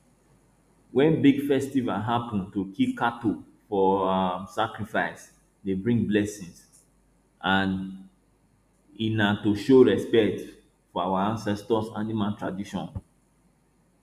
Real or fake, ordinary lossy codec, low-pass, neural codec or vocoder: real; none; 14.4 kHz; none